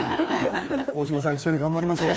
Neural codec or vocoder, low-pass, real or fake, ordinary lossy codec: codec, 16 kHz, 2 kbps, FreqCodec, larger model; none; fake; none